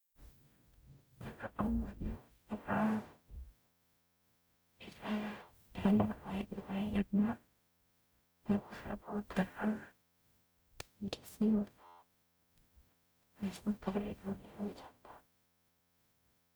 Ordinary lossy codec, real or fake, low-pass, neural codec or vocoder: none; fake; none; codec, 44.1 kHz, 0.9 kbps, DAC